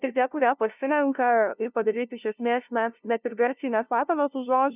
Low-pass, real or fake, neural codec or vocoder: 3.6 kHz; fake; codec, 16 kHz, 0.5 kbps, FunCodec, trained on LibriTTS, 25 frames a second